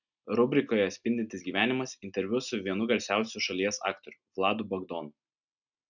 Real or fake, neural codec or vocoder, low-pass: real; none; 7.2 kHz